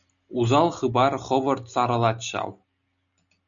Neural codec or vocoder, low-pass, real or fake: none; 7.2 kHz; real